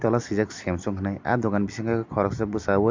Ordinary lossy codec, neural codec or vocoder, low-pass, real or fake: MP3, 48 kbps; none; 7.2 kHz; real